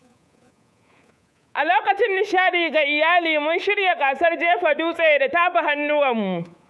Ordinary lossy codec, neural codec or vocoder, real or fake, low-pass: none; codec, 24 kHz, 3.1 kbps, DualCodec; fake; none